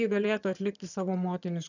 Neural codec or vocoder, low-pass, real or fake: vocoder, 22.05 kHz, 80 mel bands, HiFi-GAN; 7.2 kHz; fake